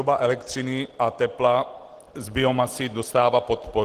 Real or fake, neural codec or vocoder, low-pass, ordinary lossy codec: real; none; 14.4 kHz; Opus, 16 kbps